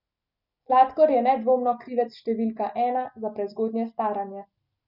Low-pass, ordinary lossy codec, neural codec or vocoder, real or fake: 5.4 kHz; none; none; real